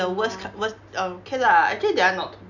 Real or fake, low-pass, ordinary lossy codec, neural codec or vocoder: real; 7.2 kHz; none; none